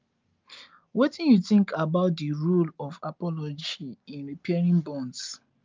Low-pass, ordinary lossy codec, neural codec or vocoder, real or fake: 7.2 kHz; Opus, 24 kbps; none; real